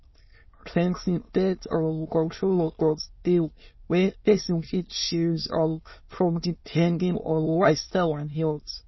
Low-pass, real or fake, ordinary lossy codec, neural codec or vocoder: 7.2 kHz; fake; MP3, 24 kbps; autoencoder, 22.05 kHz, a latent of 192 numbers a frame, VITS, trained on many speakers